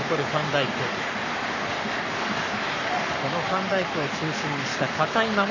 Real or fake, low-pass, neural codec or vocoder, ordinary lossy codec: fake; 7.2 kHz; codec, 44.1 kHz, 7.8 kbps, Pupu-Codec; none